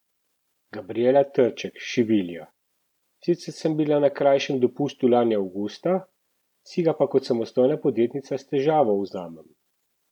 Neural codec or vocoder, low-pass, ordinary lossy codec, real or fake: none; 19.8 kHz; none; real